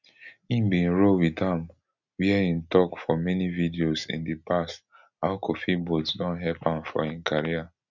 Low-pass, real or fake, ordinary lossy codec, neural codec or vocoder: 7.2 kHz; real; none; none